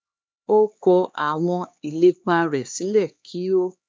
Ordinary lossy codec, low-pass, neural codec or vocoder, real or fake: none; none; codec, 16 kHz, 2 kbps, X-Codec, HuBERT features, trained on LibriSpeech; fake